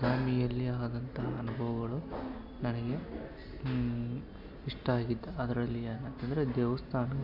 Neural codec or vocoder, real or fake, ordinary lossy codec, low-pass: none; real; none; 5.4 kHz